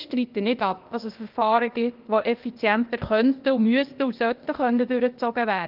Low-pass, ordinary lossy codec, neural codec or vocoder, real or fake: 5.4 kHz; Opus, 24 kbps; codec, 16 kHz, 0.8 kbps, ZipCodec; fake